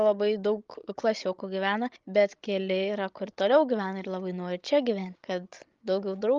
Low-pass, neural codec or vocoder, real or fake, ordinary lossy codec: 7.2 kHz; codec, 16 kHz, 16 kbps, FreqCodec, larger model; fake; Opus, 32 kbps